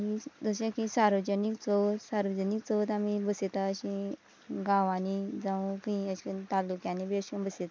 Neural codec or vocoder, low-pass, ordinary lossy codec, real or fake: none; none; none; real